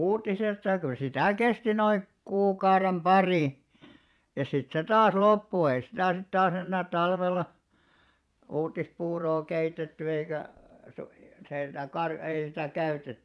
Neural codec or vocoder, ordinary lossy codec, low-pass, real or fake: vocoder, 22.05 kHz, 80 mel bands, Vocos; none; none; fake